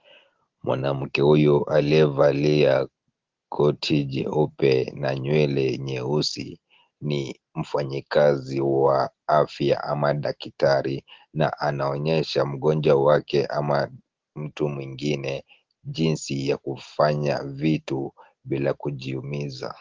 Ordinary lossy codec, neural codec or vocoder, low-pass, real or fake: Opus, 16 kbps; none; 7.2 kHz; real